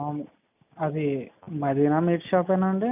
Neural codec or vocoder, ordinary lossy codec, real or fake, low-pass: none; none; real; 3.6 kHz